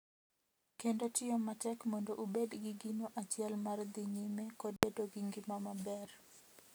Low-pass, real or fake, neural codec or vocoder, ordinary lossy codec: none; real; none; none